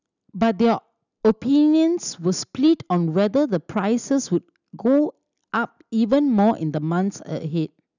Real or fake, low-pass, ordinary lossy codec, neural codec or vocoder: real; 7.2 kHz; none; none